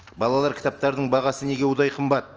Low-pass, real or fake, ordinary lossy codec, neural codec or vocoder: 7.2 kHz; real; Opus, 24 kbps; none